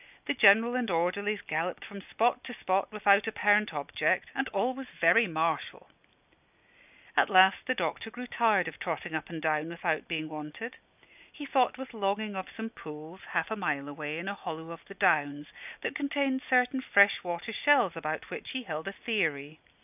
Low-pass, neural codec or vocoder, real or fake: 3.6 kHz; none; real